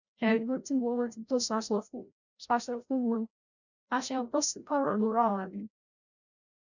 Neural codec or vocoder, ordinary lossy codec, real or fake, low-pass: codec, 16 kHz, 0.5 kbps, FreqCodec, larger model; none; fake; 7.2 kHz